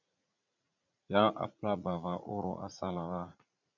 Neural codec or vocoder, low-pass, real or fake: vocoder, 44.1 kHz, 80 mel bands, Vocos; 7.2 kHz; fake